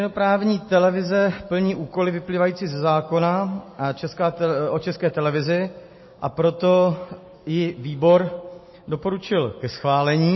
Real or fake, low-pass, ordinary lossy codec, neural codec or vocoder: real; 7.2 kHz; MP3, 24 kbps; none